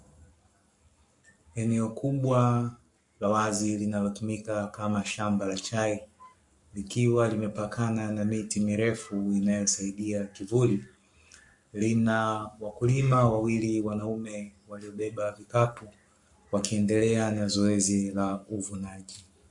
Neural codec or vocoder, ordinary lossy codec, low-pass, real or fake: codec, 44.1 kHz, 7.8 kbps, Pupu-Codec; MP3, 64 kbps; 10.8 kHz; fake